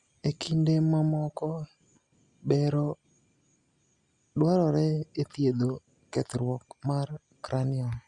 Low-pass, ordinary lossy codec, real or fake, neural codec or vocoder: 10.8 kHz; Opus, 64 kbps; real; none